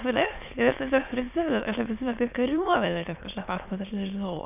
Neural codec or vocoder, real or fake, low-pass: autoencoder, 22.05 kHz, a latent of 192 numbers a frame, VITS, trained on many speakers; fake; 3.6 kHz